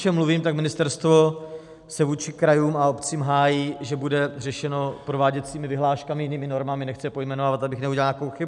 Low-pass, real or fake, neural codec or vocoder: 10.8 kHz; real; none